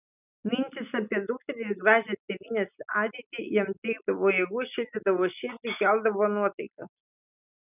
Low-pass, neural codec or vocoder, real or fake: 3.6 kHz; none; real